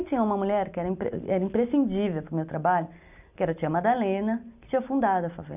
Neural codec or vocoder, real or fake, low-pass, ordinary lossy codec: none; real; 3.6 kHz; none